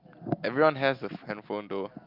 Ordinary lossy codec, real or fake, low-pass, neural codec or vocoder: Opus, 24 kbps; real; 5.4 kHz; none